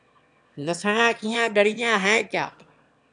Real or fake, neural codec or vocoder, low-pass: fake; autoencoder, 22.05 kHz, a latent of 192 numbers a frame, VITS, trained on one speaker; 9.9 kHz